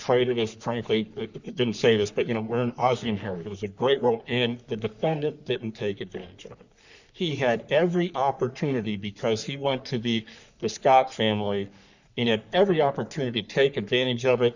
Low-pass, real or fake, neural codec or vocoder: 7.2 kHz; fake; codec, 44.1 kHz, 3.4 kbps, Pupu-Codec